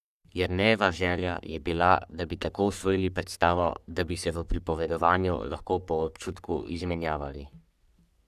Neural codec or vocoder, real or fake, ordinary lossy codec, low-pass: codec, 44.1 kHz, 3.4 kbps, Pupu-Codec; fake; none; 14.4 kHz